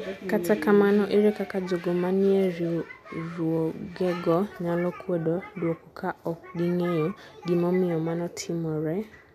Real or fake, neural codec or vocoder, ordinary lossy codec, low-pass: real; none; none; 14.4 kHz